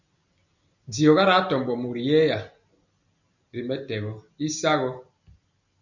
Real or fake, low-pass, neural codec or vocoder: real; 7.2 kHz; none